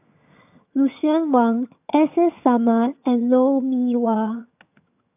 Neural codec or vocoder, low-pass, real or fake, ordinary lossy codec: vocoder, 22.05 kHz, 80 mel bands, HiFi-GAN; 3.6 kHz; fake; AAC, 32 kbps